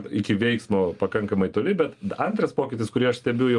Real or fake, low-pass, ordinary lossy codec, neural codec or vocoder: real; 10.8 kHz; Opus, 32 kbps; none